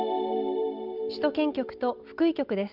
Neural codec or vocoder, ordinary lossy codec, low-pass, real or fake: none; Opus, 24 kbps; 5.4 kHz; real